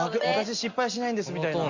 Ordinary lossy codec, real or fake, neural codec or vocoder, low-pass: Opus, 64 kbps; real; none; 7.2 kHz